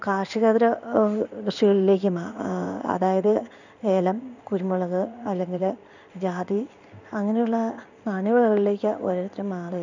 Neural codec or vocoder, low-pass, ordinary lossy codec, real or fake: codec, 16 kHz in and 24 kHz out, 1 kbps, XY-Tokenizer; 7.2 kHz; none; fake